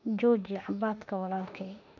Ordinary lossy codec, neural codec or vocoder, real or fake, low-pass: none; autoencoder, 48 kHz, 32 numbers a frame, DAC-VAE, trained on Japanese speech; fake; 7.2 kHz